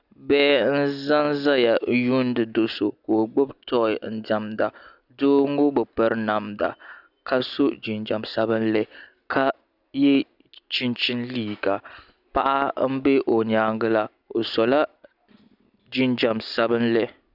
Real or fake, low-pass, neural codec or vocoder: real; 5.4 kHz; none